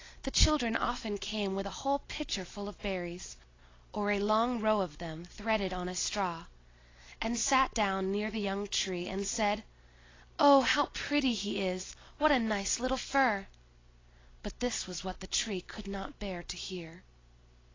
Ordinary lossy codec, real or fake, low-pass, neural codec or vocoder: AAC, 32 kbps; real; 7.2 kHz; none